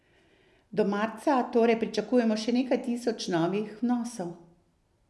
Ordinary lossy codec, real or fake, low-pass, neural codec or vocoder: none; real; none; none